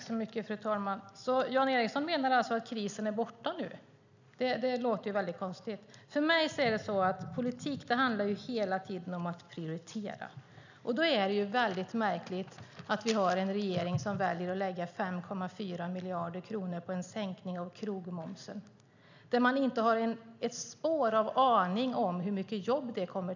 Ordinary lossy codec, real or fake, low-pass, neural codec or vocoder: none; real; 7.2 kHz; none